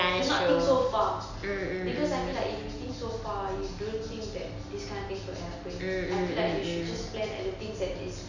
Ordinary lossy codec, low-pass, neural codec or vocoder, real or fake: none; 7.2 kHz; none; real